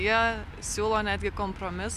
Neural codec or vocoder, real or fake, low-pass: none; real; 14.4 kHz